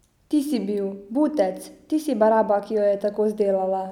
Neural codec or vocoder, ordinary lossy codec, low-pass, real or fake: none; none; 19.8 kHz; real